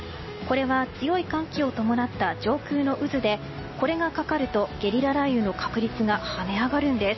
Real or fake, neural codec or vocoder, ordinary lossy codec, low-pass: real; none; MP3, 24 kbps; 7.2 kHz